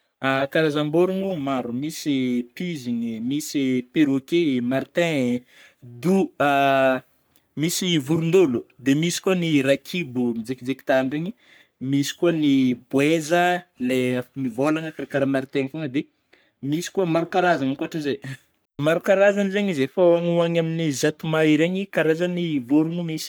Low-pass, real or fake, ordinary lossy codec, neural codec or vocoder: none; fake; none; codec, 44.1 kHz, 3.4 kbps, Pupu-Codec